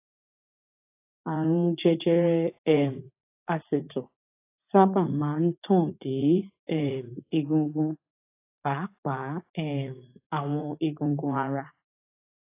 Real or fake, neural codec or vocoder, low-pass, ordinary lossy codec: fake; vocoder, 44.1 kHz, 80 mel bands, Vocos; 3.6 kHz; AAC, 24 kbps